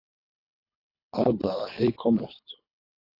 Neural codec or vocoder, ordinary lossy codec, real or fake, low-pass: codec, 24 kHz, 3 kbps, HILCodec; AAC, 24 kbps; fake; 5.4 kHz